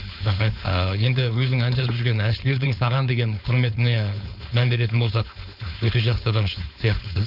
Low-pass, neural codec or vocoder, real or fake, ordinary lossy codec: 5.4 kHz; codec, 16 kHz, 4.8 kbps, FACodec; fake; none